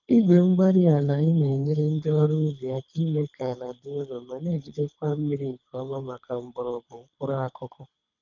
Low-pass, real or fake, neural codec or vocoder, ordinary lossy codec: 7.2 kHz; fake; codec, 24 kHz, 3 kbps, HILCodec; none